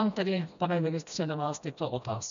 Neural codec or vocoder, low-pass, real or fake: codec, 16 kHz, 1 kbps, FreqCodec, smaller model; 7.2 kHz; fake